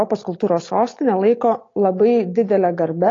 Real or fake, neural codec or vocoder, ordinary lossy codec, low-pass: real; none; AAC, 32 kbps; 7.2 kHz